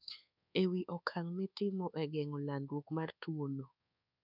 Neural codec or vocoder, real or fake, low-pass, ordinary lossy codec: codec, 24 kHz, 1.2 kbps, DualCodec; fake; 5.4 kHz; none